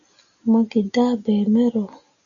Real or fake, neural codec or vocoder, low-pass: real; none; 7.2 kHz